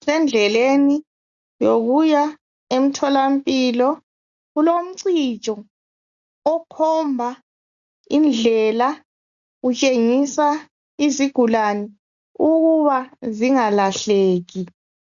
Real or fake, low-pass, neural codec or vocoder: real; 7.2 kHz; none